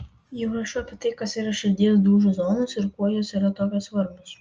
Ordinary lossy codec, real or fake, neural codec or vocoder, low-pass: Opus, 24 kbps; real; none; 7.2 kHz